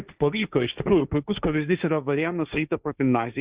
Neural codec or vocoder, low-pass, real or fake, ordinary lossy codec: codec, 16 kHz, 1.1 kbps, Voila-Tokenizer; 3.6 kHz; fake; Opus, 64 kbps